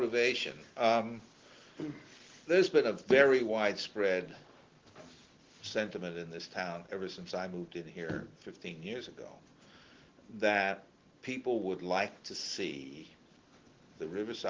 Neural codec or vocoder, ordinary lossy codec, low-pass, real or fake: none; Opus, 16 kbps; 7.2 kHz; real